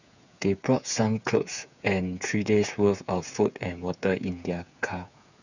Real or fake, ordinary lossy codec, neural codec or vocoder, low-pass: fake; none; codec, 16 kHz, 8 kbps, FreqCodec, smaller model; 7.2 kHz